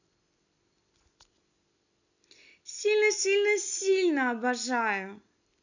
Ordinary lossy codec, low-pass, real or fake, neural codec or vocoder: none; 7.2 kHz; real; none